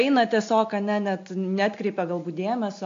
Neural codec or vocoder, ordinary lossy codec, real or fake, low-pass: none; AAC, 48 kbps; real; 7.2 kHz